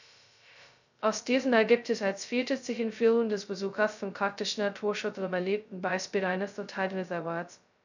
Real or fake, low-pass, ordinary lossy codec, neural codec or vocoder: fake; 7.2 kHz; none; codec, 16 kHz, 0.2 kbps, FocalCodec